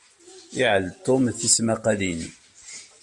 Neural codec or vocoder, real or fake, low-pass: none; real; 10.8 kHz